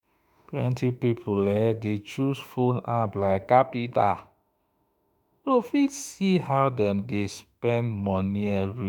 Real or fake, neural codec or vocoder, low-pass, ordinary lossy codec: fake; autoencoder, 48 kHz, 32 numbers a frame, DAC-VAE, trained on Japanese speech; none; none